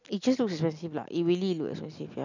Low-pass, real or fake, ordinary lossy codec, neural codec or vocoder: 7.2 kHz; real; none; none